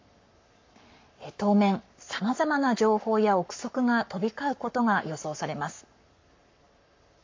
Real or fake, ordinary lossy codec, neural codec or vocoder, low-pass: fake; MP3, 48 kbps; codec, 44.1 kHz, 7.8 kbps, Pupu-Codec; 7.2 kHz